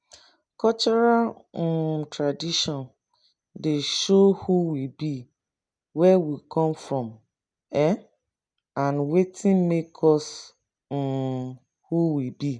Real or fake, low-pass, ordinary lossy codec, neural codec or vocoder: real; 9.9 kHz; none; none